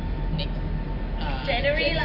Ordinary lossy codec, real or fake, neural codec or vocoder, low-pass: none; real; none; 5.4 kHz